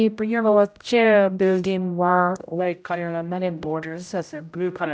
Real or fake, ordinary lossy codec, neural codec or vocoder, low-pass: fake; none; codec, 16 kHz, 0.5 kbps, X-Codec, HuBERT features, trained on general audio; none